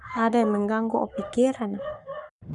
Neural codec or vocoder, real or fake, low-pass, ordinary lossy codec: codec, 44.1 kHz, 7.8 kbps, Pupu-Codec; fake; 10.8 kHz; none